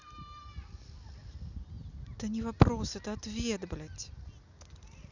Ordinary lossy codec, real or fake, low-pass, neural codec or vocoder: none; real; 7.2 kHz; none